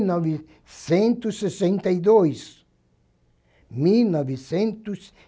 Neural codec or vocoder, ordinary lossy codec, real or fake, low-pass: none; none; real; none